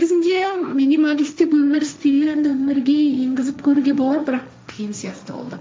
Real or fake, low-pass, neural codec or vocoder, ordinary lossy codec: fake; none; codec, 16 kHz, 1.1 kbps, Voila-Tokenizer; none